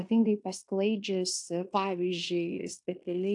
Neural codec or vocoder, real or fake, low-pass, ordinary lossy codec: codec, 16 kHz in and 24 kHz out, 0.9 kbps, LongCat-Audio-Codec, fine tuned four codebook decoder; fake; 10.8 kHz; AAC, 64 kbps